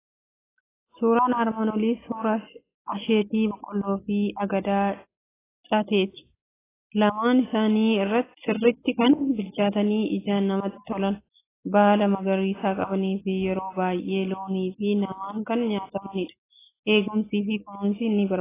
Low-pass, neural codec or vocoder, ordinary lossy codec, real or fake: 3.6 kHz; none; AAC, 16 kbps; real